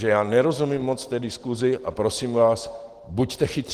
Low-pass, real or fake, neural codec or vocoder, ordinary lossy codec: 14.4 kHz; real; none; Opus, 16 kbps